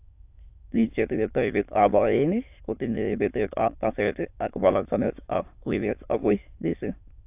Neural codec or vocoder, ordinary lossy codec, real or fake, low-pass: autoencoder, 22.05 kHz, a latent of 192 numbers a frame, VITS, trained on many speakers; AAC, 32 kbps; fake; 3.6 kHz